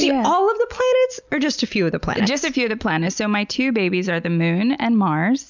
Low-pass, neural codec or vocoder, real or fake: 7.2 kHz; none; real